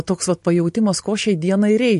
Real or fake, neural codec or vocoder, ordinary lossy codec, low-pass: real; none; MP3, 48 kbps; 14.4 kHz